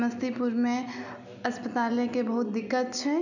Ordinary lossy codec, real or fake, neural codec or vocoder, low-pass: none; real; none; 7.2 kHz